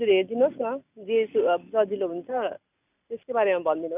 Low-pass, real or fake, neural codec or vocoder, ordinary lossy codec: 3.6 kHz; real; none; none